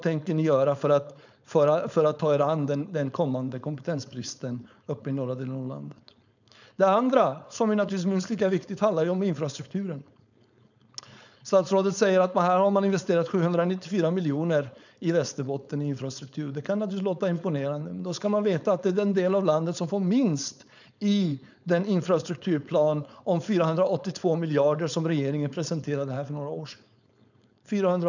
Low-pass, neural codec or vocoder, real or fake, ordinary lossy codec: 7.2 kHz; codec, 16 kHz, 4.8 kbps, FACodec; fake; none